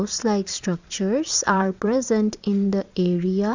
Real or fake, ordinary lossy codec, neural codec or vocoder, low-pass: fake; Opus, 64 kbps; vocoder, 22.05 kHz, 80 mel bands, Vocos; 7.2 kHz